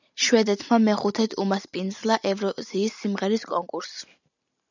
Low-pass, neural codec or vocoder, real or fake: 7.2 kHz; none; real